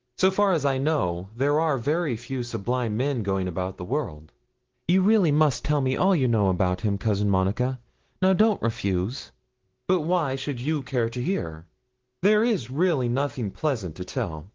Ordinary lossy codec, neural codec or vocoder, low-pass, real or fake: Opus, 16 kbps; none; 7.2 kHz; real